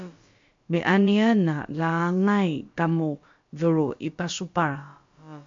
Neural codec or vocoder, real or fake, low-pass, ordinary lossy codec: codec, 16 kHz, about 1 kbps, DyCAST, with the encoder's durations; fake; 7.2 kHz; MP3, 48 kbps